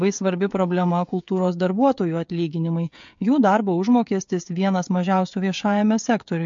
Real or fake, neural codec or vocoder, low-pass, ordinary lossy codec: fake; codec, 16 kHz, 16 kbps, FreqCodec, smaller model; 7.2 kHz; MP3, 48 kbps